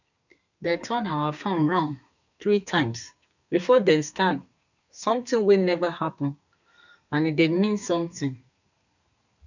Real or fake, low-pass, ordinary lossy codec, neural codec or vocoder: fake; 7.2 kHz; none; codec, 32 kHz, 1.9 kbps, SNAC